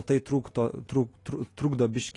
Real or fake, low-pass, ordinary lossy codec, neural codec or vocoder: real; 10.8 kHz; AAC, 32 kbps; none